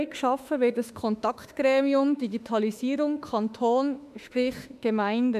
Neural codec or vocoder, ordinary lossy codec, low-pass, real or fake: autoencoder, 48 kHz, 32 numbers a frame, DAC-VAE, trained on Japanese speech; none; 14.4 kHz; fake